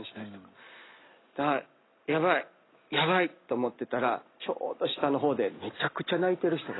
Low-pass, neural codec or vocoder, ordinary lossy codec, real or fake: 7.2 kHz; none; AAC, 16 kbps; real